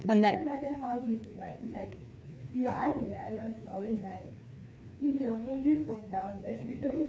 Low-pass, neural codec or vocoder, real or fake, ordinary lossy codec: none; codec, 16 kHz, 2 kbps, FreqCodec, larger model; fake; none